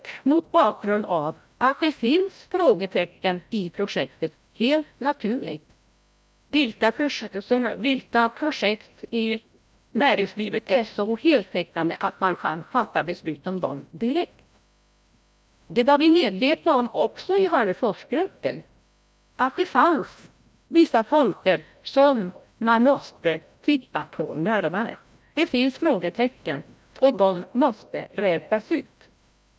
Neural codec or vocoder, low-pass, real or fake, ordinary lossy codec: codec, 16 kHz, 0.5 kbps, FreqCodec, larger model; none; fake; none